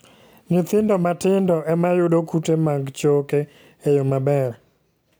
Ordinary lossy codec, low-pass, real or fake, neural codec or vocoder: none; none; real; none